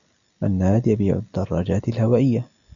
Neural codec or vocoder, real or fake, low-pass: none; real; 7.2 kHz